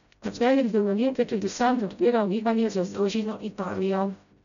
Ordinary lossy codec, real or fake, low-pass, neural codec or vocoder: none; fake; 7.2 kHz; codec, 16 kHz, 0.5 kbps, FreqCodec, smaller model